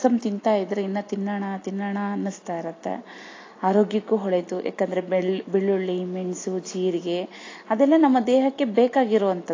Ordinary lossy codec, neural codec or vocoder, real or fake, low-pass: AAC, 32 kbps; none; real; 7.2 kHz